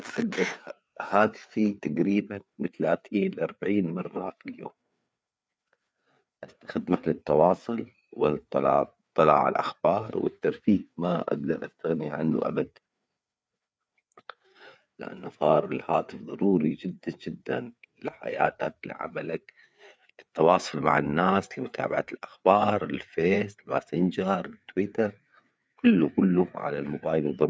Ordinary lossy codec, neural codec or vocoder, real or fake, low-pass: none; codec, 16 kHz, 4 kbps, FreqCodec, larger model; fake; none